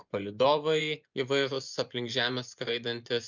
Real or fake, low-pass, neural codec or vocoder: fake; 7.2 kHz; vocoder, 24 kHz, 100 mel bands, Vocos